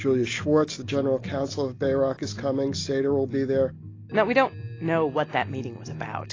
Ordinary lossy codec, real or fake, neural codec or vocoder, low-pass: AAC, 32 kbps; real; none; 7.2 kHz